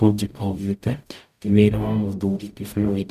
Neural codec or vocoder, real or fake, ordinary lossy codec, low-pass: codec, 44.1 kHz, 0.9 kbps, DAC; fake; none; 14.4 kHz